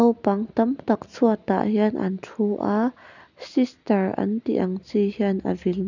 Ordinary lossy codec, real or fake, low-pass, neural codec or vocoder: none; real; 7.2 kHz; none